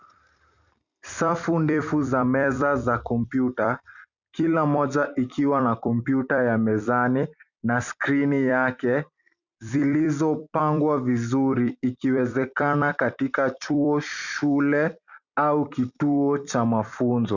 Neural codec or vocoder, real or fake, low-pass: vocoder, 44.1 kHz, 128 mel bands every 256 samples, BigVGAN v2; fake; 7.2 kHz